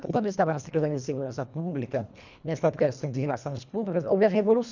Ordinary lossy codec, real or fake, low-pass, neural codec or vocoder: none; fake; 7.2 kHz; codec, 24 kHz, 1.5 kbps, HILCodec